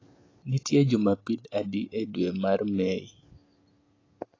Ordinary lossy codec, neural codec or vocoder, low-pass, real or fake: AAC, 32 kbps; none; 7.2 kHz; real